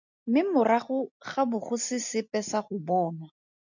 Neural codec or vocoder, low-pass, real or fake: none; 7.2 kHz; real